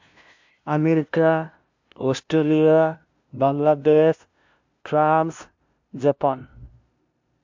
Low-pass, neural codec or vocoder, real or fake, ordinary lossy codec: 7.2 kHz; codec, 16 kHz, 0.5 kbps, FunCodec, trained on LibriTTS, 25 frames a second; fake; MP3, 64 kbps